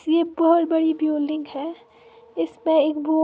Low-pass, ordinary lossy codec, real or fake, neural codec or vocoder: none; none; real; none